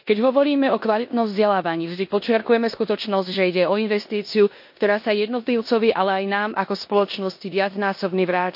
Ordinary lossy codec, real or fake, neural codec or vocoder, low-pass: MP3, 32 kbps; fake; codec, 16 kHz in and 24 kHz out, 0.9 kbps, LongCat-Audio-Codec, four codebook decoder; 5.4 kHz